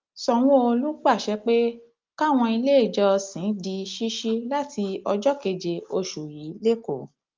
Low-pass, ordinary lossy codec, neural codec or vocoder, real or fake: 7.2 kHz; Opus, 24 kbps; none; real